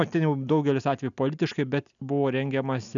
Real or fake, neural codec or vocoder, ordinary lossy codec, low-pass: real; none; MP3, 96 kbps; 7.2 kHz